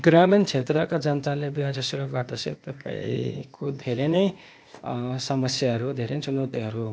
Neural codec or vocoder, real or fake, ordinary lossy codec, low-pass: codec, 16 kHz, 0.8 kbps, ZipCodec; fake; none; none